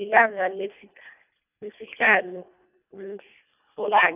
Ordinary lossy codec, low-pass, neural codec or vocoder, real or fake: none; 3.6 kHz; codec, 24 kHz, 1.5 kbps, HILCodec; fake